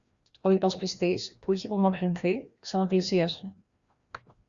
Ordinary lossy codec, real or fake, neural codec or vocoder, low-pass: Opus, 64 kbps; fake; codec, 16 kHz, 1 kbps, FreqCodec, larger model; 7.2 kHz